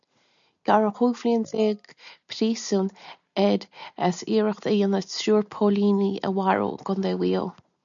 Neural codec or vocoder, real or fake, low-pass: none; real; 7.2 kHz